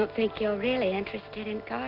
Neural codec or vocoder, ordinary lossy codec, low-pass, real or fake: none; Opus, 32 kbps; 5.4 kHz; real